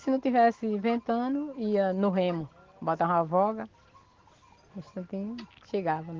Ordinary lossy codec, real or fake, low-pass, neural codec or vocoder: Opus, 16 kbps; real; 7.2 kHz; none